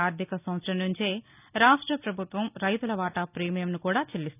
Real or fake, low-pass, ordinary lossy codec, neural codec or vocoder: real; 3.6 kHz; none; none